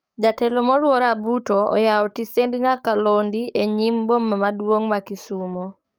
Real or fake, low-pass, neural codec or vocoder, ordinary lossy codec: fake; none; codec, 44.1 kHz, 7.8 kbps, DAC; none